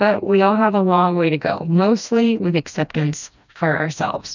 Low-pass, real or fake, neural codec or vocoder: 7.2 kHz; fake; codec, 16 kHz, 1 kbps, FreqCodec, smaller model